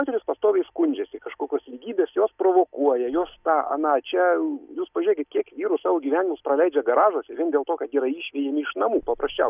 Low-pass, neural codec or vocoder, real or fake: 3.6 kHz; none; real